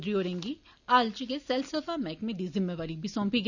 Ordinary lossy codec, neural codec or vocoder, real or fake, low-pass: none; none; real; 7.2 kHz